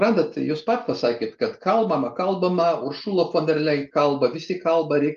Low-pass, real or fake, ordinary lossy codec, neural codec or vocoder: 7.2 kHz; real; Opus, 32 kbps; none